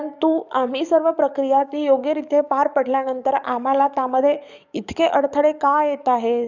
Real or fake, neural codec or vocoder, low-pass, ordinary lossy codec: fake; codec, 44.1 kHz, 7.8 kbps, DAC; 7.2 kHz; none